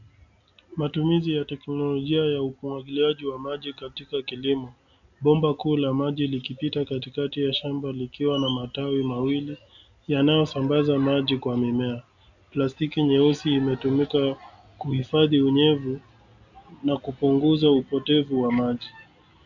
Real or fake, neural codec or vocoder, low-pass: real; none; 7.2 kHz